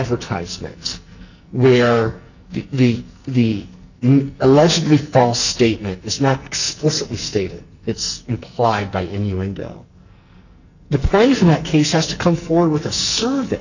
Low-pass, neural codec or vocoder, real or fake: 7.2 kHz; codec, 44.1 kHz, 2.6 kbps, SNAC; fake